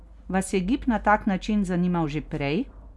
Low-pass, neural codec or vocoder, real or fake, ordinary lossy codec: none; none; real; none